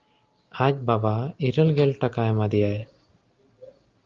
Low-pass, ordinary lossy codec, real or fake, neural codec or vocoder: 7.2 kHz; Opus, 32 kbps; real; none